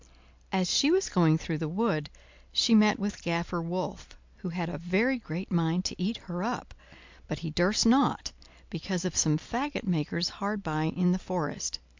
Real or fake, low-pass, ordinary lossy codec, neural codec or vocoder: real; 7.2 kHz; MP3, 64 kbps; none